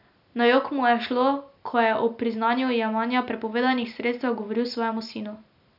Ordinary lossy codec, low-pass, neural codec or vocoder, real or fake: none; 5.4 kHz; none; real